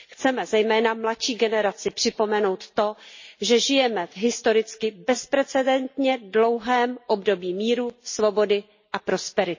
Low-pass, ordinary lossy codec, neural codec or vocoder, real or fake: 7.2 kHz; MP3, 32 kbps; none; real